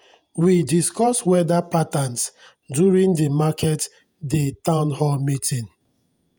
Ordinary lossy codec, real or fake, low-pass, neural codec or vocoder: none; fake; none; vocoder, 48 kHz, 128 mel bands, Vocos